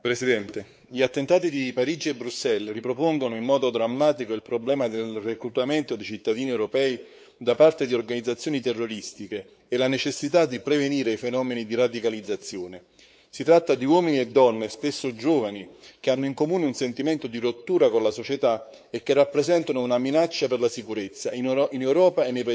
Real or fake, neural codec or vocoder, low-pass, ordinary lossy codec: fake; codec, 16 kHz, 4 kbps, X-Codec, WavLM features, trained on Multilingual LibriSpeech; none; none